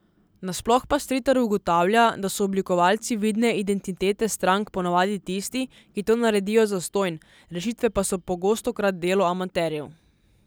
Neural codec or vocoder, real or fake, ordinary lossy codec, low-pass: none; real; none; none